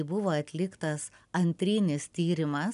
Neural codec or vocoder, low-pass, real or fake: none; 10.8 kHz; real